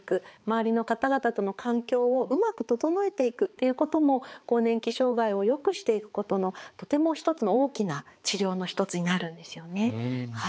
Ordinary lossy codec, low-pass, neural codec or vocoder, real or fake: none; none; codec, 16 kHz, 4 kbps, X-Codec, HuBERT features, trained on balanced general audio; fake